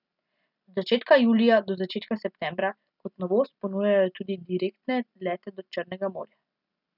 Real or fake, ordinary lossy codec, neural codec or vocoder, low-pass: real; none; none; 5.4 kHz